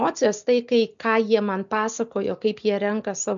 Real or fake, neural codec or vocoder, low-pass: real; none; 7.2 kHz